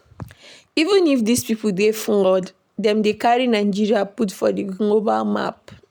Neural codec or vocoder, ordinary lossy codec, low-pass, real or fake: none; none; none; real